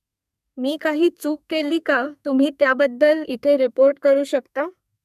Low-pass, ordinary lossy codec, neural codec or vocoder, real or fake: 14.4 kHz; none; codec, 32 kHz, 1.9 kbps, SNAC; fake